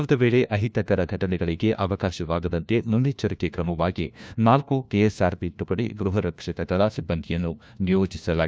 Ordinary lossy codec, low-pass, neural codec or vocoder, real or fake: none; none; codec, 16 kHz, 1 kbps, FunCodec, trained on LibriTTS, 50 frames a second; fake